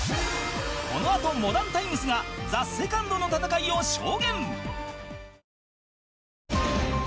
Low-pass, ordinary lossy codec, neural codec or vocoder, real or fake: none; none; none; real